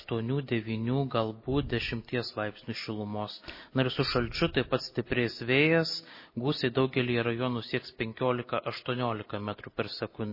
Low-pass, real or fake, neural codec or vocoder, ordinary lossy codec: 5.4 kHz; real; none; MP3, 24 kbps